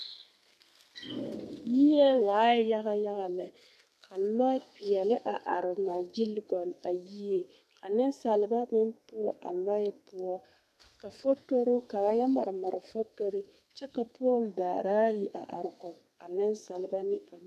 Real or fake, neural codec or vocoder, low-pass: fake; codec, 32 kHz, 1.9 kbps, SNAC; 14.4 kHz